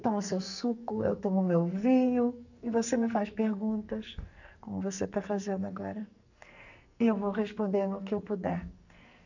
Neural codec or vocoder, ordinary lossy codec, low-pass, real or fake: codec, 44.1 kHz, 2.6 kbps, SNAC; none; 7.2 kHz; fake